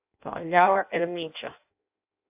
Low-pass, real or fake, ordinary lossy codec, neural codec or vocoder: 3.6 kHz; fake; AAC, 32 kbps; codec, 16 kHz in and 24 kHz out, 0.6 kbps, FireRedTTS-2 codec